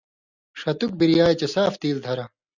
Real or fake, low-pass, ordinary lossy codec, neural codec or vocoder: real; 7.2 kHz; Opus, 64 kbps; none